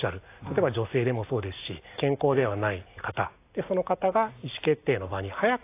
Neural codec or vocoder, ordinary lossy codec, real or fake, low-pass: none; AAC, 24 kbps; real; 3.6 kHz